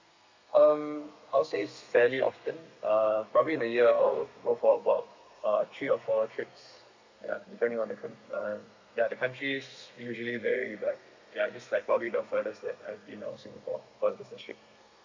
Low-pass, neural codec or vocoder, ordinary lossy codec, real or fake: 7.2 kHz; codec, 32 kHz, 1.9 kbps, SNAC; MP3, 64 kbps; fake